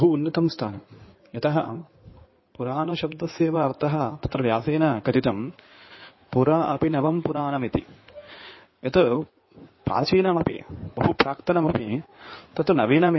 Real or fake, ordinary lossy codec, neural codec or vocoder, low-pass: fake; MP3, 24 kbps; codec, 16 kHz in and 24 kHz out, 2.2 kbps, FireRedTTS-2 codec; 7.2 kHz